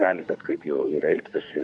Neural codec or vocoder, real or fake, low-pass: codec, 24 kHz, 1 kbps, SNAC; fake; 10.8 kHz